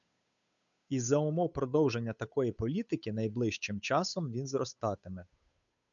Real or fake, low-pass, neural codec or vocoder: fake; 7.2 kHz; codec, 16 kHz, 8 kbps, FunCodec, trained on Chinese and English, 25 frames a second